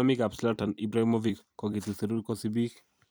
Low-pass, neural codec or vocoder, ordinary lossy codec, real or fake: none; vocoder, 44.1 kHz, 128 mel bands every 256 samples, BigVGAN v2; none; fake